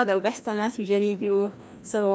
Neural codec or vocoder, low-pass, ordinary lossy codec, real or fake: codec, 16 kHz, 1 kbps, FreqCodec, larger model; none; none; fake